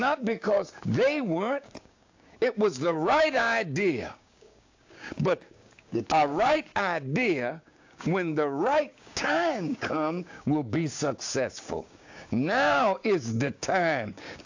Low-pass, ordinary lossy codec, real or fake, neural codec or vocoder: 7.2 kHz; AAC, 48 kbps; fake; codec, 16 kHz, 6 kbps, DAC